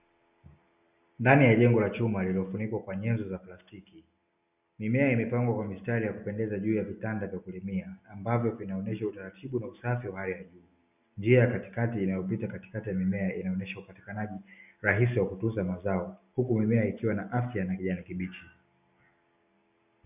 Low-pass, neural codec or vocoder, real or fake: 3.6 kHz; none; real